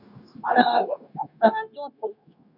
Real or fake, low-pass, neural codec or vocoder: fake; 5.4 kHz; codec, 16 kHz, 0.9 kbps, LongCat-Audio-Codec